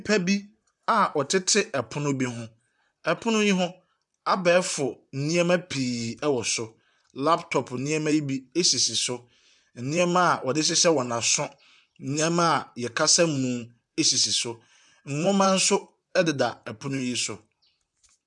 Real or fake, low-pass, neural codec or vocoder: fake; 10.8 kHz; vocoder, 44.1 kHz, 128 mel bands, Pupu-Vocoder